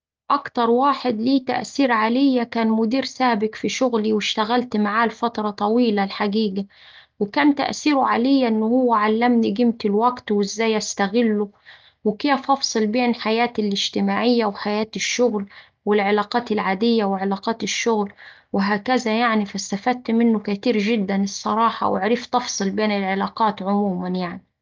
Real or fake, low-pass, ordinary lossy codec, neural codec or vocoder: real; 7.2 kHz; Opus, 24 kbps; none